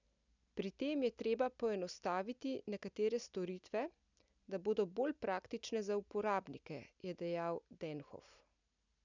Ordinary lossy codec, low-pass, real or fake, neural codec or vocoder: none; 7.2 kHz; real; none